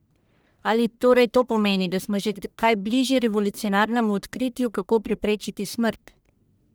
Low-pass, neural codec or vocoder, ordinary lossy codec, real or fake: none; codec, 44.1 kHz, 1.7 kbps, Pupu-Codec; none; fake